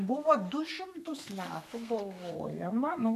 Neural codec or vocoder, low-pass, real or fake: codec, 32 kHz, 1.9 kbps, SNAC; 14.4 kHz; fake